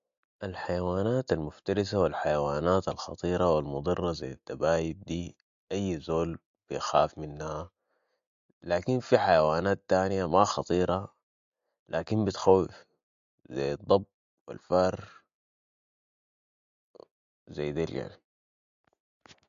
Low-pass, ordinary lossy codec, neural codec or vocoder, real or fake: 7.2 kHz; MP3, 48 kbps; none; real